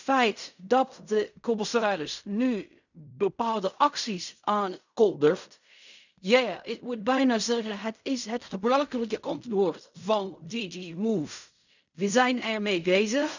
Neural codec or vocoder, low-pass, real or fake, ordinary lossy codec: codec, 16 kHz in and 24 kHz out, 0.4 kbps, LongCat-Audio-Codec, fine tuned four codebook decoder; 7.2 kHz; fake; none